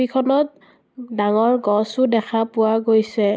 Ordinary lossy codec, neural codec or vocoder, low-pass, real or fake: none; none; none; real